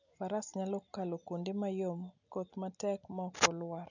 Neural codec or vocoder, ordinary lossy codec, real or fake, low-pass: none; none; real; 7.2 kHz